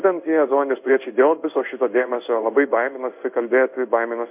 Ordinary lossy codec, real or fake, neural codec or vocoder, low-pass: MP3, 32 kbps; fake; codec, 16 kHz in and 24 kHz out, 1 kbps, XY-Tokenizer; 3.6 kHz